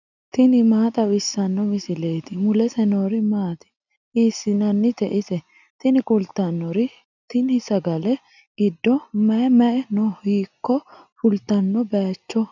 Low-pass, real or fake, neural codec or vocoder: 7.2 kHz; real; none